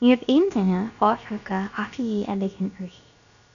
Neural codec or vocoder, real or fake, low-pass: codec, 16 kHz, about 1 kbps, DyCAST, with the encoder's durations; fake; 7.2 kHz